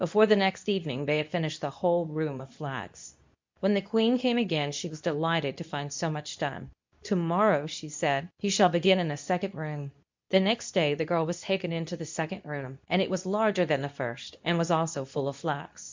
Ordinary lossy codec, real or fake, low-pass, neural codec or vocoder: MP3, 64 kbps; fake; 7.2 kHz; codec, 24 kHz, 0.9 kbps, WavTokenizer, medium speech release version 2